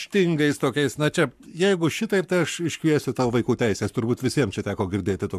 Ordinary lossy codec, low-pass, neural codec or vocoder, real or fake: MP3, 96 kbps; 14.4 kHz; codec, 44.1 kHz, 7.8 kbps, Pupu-Codec; fake